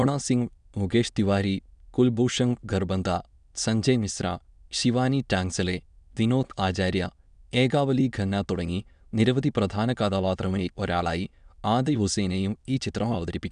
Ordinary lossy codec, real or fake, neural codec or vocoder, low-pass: none; fake; autoencoder, 22.05 kHz, a latent of 192 numbers a frame, VITS, trained on many speakers; 9.9 kHz